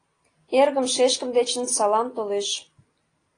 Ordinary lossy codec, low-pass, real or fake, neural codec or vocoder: AAC, 32 kbps; 9.9 kHz; real; none